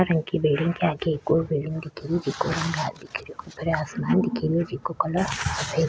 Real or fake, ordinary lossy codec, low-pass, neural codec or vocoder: real; none; none; none